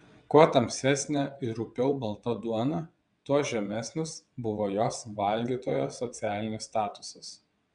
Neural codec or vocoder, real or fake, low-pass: vocoder, 22.05 kHz, 80 mel bands, WaveNeXt; fake; 9.9 kHz